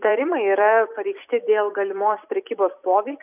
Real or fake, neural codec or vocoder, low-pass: fake; vocoder, 44.1 kHz, 128 mel bands every 256 samples, BigVGAN v2; 3.6 kHz